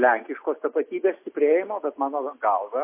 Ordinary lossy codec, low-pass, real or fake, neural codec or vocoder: MP3, 24 kbps; 3.6 kHz; real; none